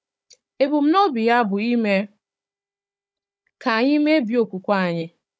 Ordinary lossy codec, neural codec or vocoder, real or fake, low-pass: none; codec, 16 kHz, 16 kbps, FunCodec, trained on Chinese and English, 50 frames a second; fake; none